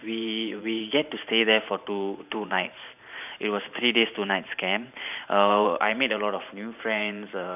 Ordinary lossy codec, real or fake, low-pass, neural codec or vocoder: none; fake; 3.6 kHz; vocoder, 44.1 kHz, 128 mel bands every 512 samples, BigVGAN v2